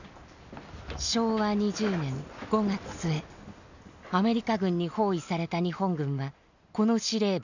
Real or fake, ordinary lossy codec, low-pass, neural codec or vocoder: real; none; 7.2 kHz; none